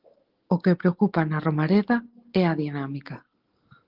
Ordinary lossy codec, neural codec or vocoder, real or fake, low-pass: Opus, 16 kbps; none; real; 5.4 kHz